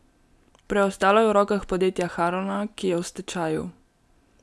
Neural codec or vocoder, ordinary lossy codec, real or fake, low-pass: none; none; real; none